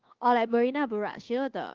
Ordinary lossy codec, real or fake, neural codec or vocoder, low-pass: Opus, 16 kbps; fake; codec, 24 kHz, 1.2 kbps, DualCodec; 7.2 kHz